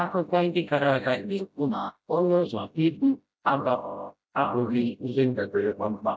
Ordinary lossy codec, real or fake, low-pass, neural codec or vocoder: none; fake; none; codec, 16 kHz, 0.5 kbps, FreqCodec, smaller model